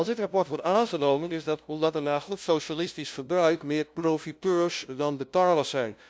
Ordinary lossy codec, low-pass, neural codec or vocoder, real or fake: none; none; codec, 16 kHz, 0.5 kbps, FunCodec, trained on LibriTTS, 25 frames a second; fake